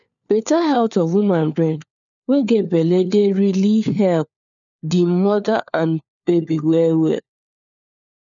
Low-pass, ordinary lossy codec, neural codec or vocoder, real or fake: 7.2 kHz; none; codec, 16 kHz, 4 kbps, FunCodec, trained on LibriTTS, 50 frames a second; fake